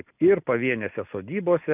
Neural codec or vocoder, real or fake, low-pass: none; real; 3.6 kHz